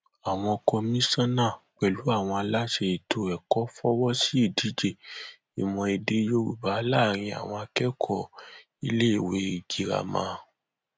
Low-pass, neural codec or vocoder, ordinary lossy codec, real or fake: none; none; none; real